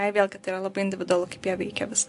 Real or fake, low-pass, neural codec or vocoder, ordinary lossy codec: real; 10.8 kHz; none; AAC, 64 kbps